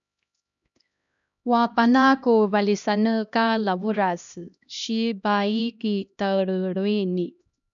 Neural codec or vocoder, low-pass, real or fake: codec, 16 kHz, 1 kbps, X-Codec, HuBERT features, trained on LibriSpeech; 7.2 kHz; fake